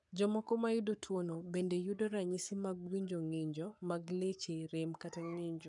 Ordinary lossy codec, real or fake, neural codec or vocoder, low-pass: none; fake; codec, 44.1 kHz, 7.8 kbps, Pupu-Codec; 10.8 kHz